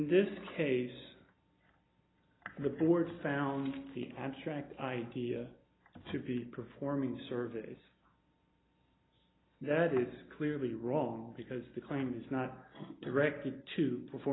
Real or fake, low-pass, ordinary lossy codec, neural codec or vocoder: real; 7.2 kHz; AAC, 16 kbps; none